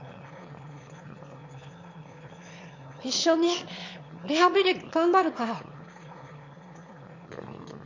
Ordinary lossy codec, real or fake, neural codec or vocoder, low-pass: MP3, 48 kbps; fake; autoencoder, 22.05 kHz, a latent of 192 numbers a frame, VITS, trained on one speaker; 7.2 kHz